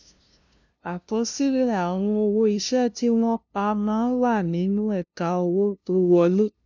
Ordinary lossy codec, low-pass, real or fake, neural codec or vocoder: none; 7.2 kHz; fake; codec, 16 kHz, 0.5 kbps, FunCodec, trained on LibriTTS, 25 frames a second